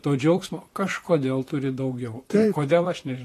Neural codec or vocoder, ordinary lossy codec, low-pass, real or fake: vocoder, 44.1 kHz, 128 mel bands, Pupu-Vocoder; AAC, 48 kbps; 14.4 kHz; fake